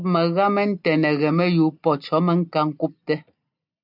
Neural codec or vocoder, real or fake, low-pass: none; real; 5.4 kHz